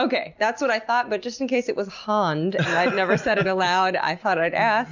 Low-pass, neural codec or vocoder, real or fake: 7.2 kHz; none; real